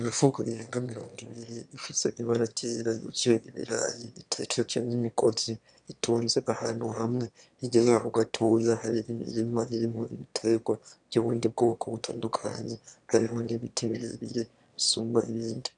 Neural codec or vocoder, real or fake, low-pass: autoencoder, 22.05 kHz, a latent of 192 numbers a frame, VITS, trained on one speaker; fake; 9.9 kHz